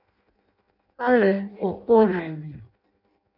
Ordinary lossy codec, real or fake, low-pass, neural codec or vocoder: AAC, 48 kbps; fake; 5.4 kHz; codec, 16 kHz in and 24 kHz out, 0.6 kbps, FireRedTTS-2 codec